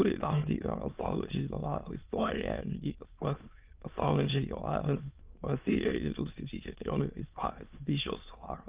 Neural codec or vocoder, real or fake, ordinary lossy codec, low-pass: autoencoder, 22.05 kHz, a latent of 192 numbers a frame, VITS, trained on many speakers; fake; Opus, 32 kbps; 3.6 kHz